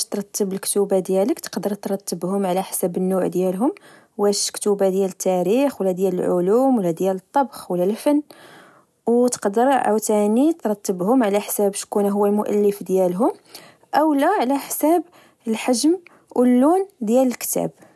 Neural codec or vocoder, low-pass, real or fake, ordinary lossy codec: none; none; real; none